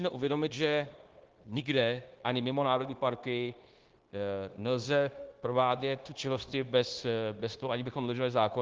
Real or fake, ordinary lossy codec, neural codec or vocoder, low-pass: fake; Opus, 16 kbps; codec, 16 kHz, 0.9 kbps, LongCat-Audio-Codec; 7.2 kHz